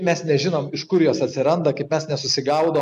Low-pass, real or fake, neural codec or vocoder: 14.4 kHz; real; none